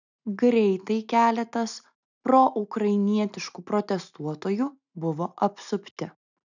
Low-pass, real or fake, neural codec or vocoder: 7.2 kHz; real; none